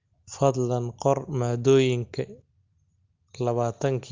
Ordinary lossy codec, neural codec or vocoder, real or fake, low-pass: Opus, 32 kbps; none; real; 7.2 kHz